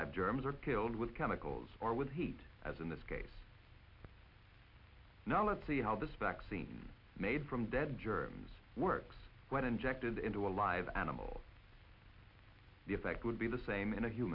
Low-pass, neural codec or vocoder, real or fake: 5.4 kHz; none; real